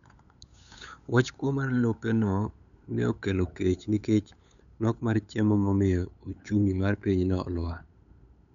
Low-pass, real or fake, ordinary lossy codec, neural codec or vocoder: 7.2 kHz; fake; none; codec, 16 kHz, 8 kbps, FunCodec, trained on LibriTTS, 25 frames a second